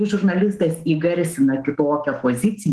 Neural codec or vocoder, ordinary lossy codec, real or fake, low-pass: autoencoder, 48 kHz, 128 numbers a frame, DAC-VAE, trained on Japanese speech; Opus, 16 kbps; fake; 10.8 kHz